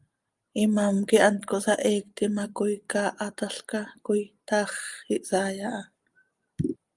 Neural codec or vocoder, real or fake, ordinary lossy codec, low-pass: none; real; Opus, 32 kbps; 10.8 kHz